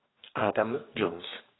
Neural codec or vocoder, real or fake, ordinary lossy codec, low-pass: codec, 44.1 kHz, 2.6 kbps, DAC; fake; AAC, 16 kbps; 7.2 kHz